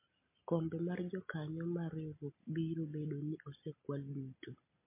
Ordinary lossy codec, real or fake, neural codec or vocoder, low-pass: MP3, 24 kbps; real; none; 3.6 kHz